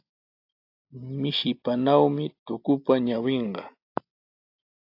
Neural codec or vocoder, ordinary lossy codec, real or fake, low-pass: none; AAC, 32 kbps; real; 5.4 kHz